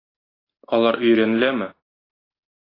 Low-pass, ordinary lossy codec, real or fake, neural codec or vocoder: 5.4 kHz; AAC, 24 kbps; real; none